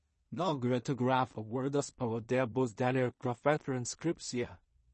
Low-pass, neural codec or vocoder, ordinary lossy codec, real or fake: 10.8 kHz; codec, 16 kHz in and 24 kHz out, 0.4 kbps, LongCat-Audio-Codec, two codebook decoder; MP3, 32 kbps; fake